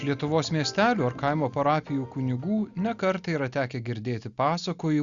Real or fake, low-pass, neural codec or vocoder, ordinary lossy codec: real; 7.2 kHz; none; Opus, 64 kbps